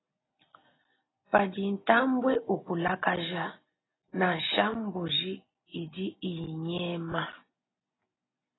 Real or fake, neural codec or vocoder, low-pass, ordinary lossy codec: real; none; 7.2 kHz; AAC, 16 kbps